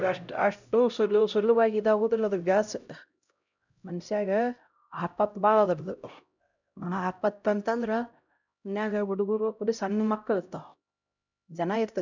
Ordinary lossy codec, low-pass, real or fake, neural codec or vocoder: none; 7.2 kHz; fake; codec, 16 kHz, 0.5 kbps, X-Codec, HuBERT features, trained on LibriSpeech